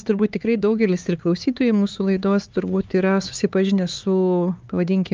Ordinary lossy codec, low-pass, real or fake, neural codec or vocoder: Opus, 24 kbps; 7.2 kHz; fake; codec, 16 kHz, 4 kbps, X-Codec, HuBERT features, trained on LibriSpeech